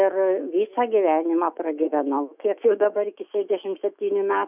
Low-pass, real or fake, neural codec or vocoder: 3.6 kHz; fake; autoencoder, 48 kHz, 128 numbers a frame, DAC-VAE, trained on Japanese speech